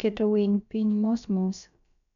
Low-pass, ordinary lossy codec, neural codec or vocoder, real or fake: 7.2 kHz; none; codec, 16 kHz, about 1 kbps, DyCAST, with the encoder's durations; fake